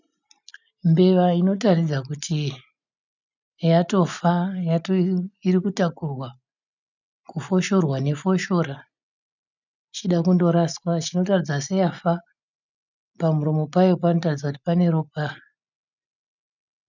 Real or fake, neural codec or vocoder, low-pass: real; none; 7.2 kHz